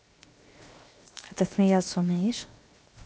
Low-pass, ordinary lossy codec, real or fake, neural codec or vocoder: none; none; fake; codec, 16 kHz, 0.7 kbps, FocalCodec